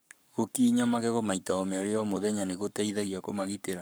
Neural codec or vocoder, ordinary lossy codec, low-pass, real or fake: codec, 44.1 kHz, 7.8 kbps, Pupu-Codec; none; none; fake